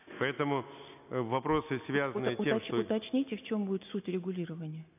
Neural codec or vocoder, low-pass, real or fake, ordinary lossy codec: none; 3.6 kHz; real; none